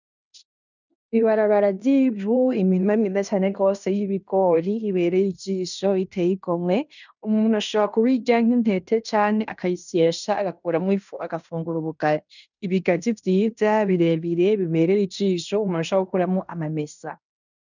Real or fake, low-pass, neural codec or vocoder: fake; 7.2 kHz; codec, 16 kHz in and 24 kHz out, 0.9 kbps, LongCat-Audio-Codec, fine tuned four codebook decoder